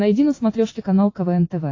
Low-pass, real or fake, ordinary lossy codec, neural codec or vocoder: 7.2 kHz; real; AAC, 32 kbps; none